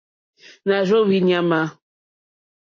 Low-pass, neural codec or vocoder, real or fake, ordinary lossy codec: 7.2 kHz; none; real; MP3, 32 kbps